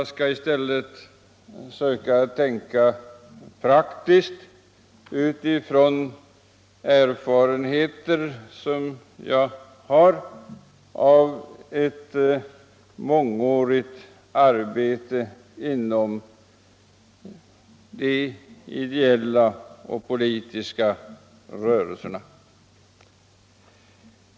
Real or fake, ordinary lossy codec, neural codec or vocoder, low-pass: real; none; none; none